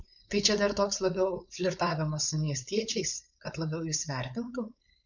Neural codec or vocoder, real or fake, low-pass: codec, 16 kHz, 4.8 kbps, FACodec; fake; 7.2 kHz